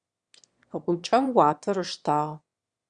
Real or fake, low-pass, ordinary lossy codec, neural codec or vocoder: fake; 9.9 kHz; Opus, 64 kbps; autoencoder, 22.05 kHz, a latent of 192 numbers a frame, VITS, trained on one speaker